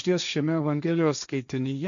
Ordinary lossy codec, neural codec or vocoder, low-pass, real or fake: MP3, 96 kbps; codec, 16 kHz, 1.1 kbps, Voila-Tokenizer; 7.2 kHz; fake